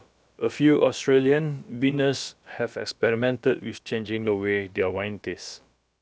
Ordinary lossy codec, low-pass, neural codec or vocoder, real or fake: none; none; codec, 16 kHz, about 1 kbps, DyCAST, with the encoder's durations; fake